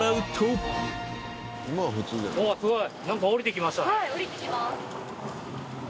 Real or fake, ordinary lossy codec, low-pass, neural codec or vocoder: real; none; none; none